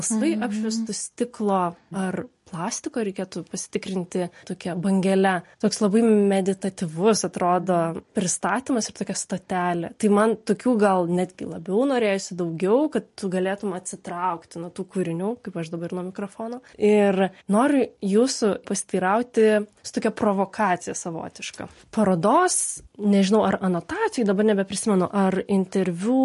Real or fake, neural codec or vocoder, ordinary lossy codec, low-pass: real; none; MP3, 48 kbps; 14.4 kHz